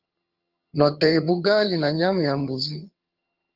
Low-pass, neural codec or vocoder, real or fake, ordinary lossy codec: 5.4 kHz; vocoder, 22.05 kHz, 80 mel bands, HiFi-GAN; fake; Opus, 24 kbps